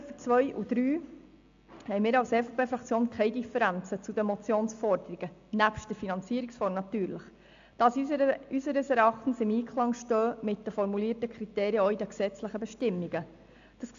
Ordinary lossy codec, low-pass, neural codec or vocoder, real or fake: AAC, 64 kbps; 7.2 kHz; none; real